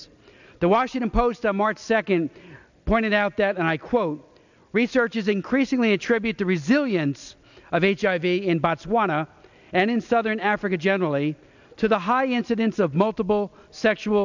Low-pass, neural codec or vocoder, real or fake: 7.2 kHz; none; real